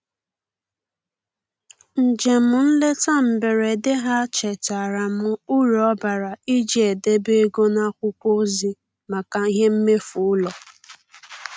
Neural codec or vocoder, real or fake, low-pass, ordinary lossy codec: none; real; none; none